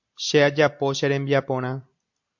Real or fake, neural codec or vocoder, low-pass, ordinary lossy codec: real; none; 7.2 kHz; MP3, 48 kbps